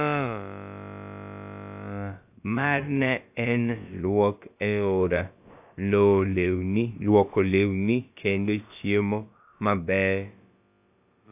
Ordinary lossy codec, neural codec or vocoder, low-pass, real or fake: AAC, 32 kbps; codec, 16 kHz, about 1 kbps, DyCAST, with the encoder's durations; 3.6 kHz; fake